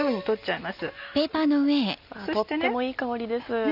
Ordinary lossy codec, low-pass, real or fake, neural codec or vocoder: none; 5.4 kHz; real; none